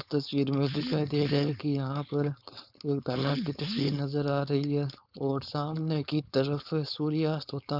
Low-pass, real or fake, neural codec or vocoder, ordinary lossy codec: 5.4 kHz; fake; codec, 16 kHz, 4.8 kbps, FACodec; none